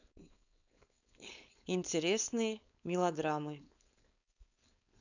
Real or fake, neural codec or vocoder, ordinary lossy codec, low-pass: fake; codec, 16 kHz, 4.8 kbps, FACodec; none; 7.2 kHz